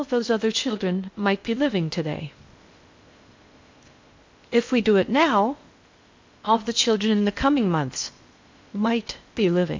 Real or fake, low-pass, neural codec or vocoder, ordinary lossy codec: fake; 7.2 kHz; codec, 16 kHz in and 24 kHz out, 0.8 kbps, FocalCodec, streaming, 65536 codes; MP3, 48 kbps